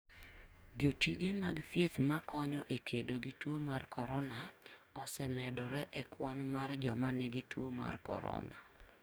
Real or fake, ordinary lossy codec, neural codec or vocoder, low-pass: fake; none; codec, 44.1 kHz, 2.6 kbps, DAC; none